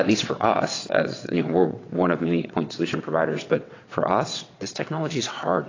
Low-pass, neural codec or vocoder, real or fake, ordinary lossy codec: 7.2 kHz; vocoder, 22.05 kHz, 80 mel bands, WaveNeXt; fake; AAC, 32 kbps